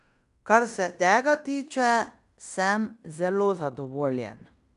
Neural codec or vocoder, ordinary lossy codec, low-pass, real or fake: codec, 16 kHz in and 24 kHz out, 0.9 kbps, LongCat-Audio-Codec, fine tuned four codebook decoder; none; 10.8 kHz; fake